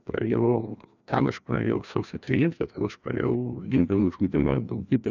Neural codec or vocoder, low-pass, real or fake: codec, 16 kHz, 1 kbps, FreqCodec, larger model; 7.2 kHz; fake